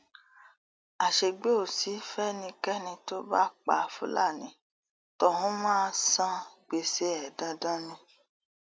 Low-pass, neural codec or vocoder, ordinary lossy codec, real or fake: none; none; none; real